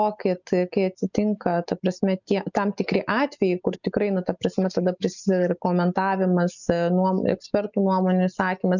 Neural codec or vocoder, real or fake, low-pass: none; real; 7.2 kHz